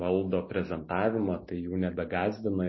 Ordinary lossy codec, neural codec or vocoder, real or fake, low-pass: MP3, 24 kbps; none; real; 7.2 kHz